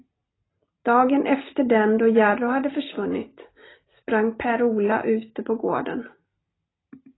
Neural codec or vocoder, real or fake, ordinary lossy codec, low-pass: none; real; AAC, 16 kbps; 7.2 kHz